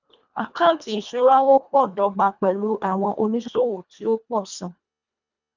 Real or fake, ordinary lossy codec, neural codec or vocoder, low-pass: fake; none; codec, 24 kHz, 1.5 kbps, HILCodec; 7.2 kHz